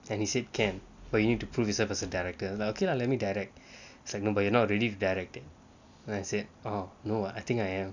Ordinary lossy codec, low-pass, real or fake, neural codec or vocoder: none; 7.2 kHz; real; none